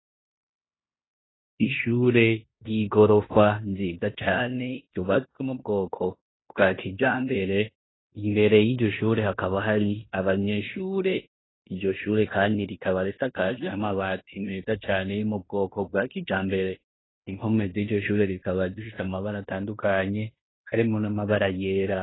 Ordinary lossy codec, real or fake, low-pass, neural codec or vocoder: AAC, 16 kbps; fake; 7.2 kHz; codec, 16 kHz in and 24 kHz out, 0.9 kbps, LongCat-Audio-Codec, fine tuned four codebook decoder